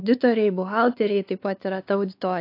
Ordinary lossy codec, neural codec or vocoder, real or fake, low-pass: AAC, 32 kbps; codec, 16 kHz, 4 kbps, X-Codec, WavLM features, trained on Multilingual LibriSpeech; fake; 5.4 kHz